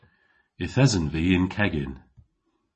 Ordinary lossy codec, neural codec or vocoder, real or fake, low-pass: MP3, 32 kbps; none; real; 10.8 kHz